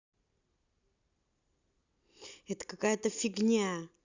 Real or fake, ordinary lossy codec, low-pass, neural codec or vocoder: real; Opus, 64 kbps; 7.2 kHz; none